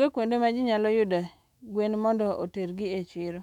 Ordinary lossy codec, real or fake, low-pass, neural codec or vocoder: none; fake; 19.8 kHz; autoencoder, 48 kHz, 128 numbers a frame, DAC-VAE, trained on Japanese speech